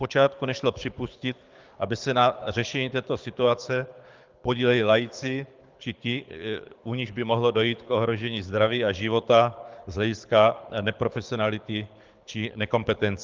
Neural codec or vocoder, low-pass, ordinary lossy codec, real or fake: codec, 24 kHz, 6 kbps, HILCodec; 7.2 kHz; Opus, 24 kbps; fake